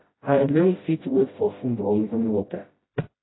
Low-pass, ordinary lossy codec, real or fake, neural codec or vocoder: 7.2 kHz; AAC, 16 kbps; fake; codec, 16 kHz, 0.5 kbps, FreqCodec, smaller model